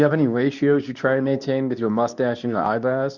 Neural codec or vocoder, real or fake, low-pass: codec, 24 kHz, 0.9 kbps, WavTokenizer, medium speech release version 2; fake; 7.2 kHz